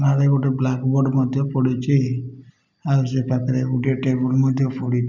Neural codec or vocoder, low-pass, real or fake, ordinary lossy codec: none; 7.2 kHz; real; none